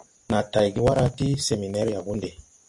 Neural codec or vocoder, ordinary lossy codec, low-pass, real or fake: none; MP3, 48 kbps; 10.8 kHz; real